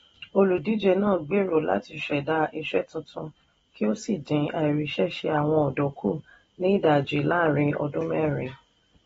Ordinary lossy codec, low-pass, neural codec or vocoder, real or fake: AAC, 24 kbps; 10.8 kHz; none; real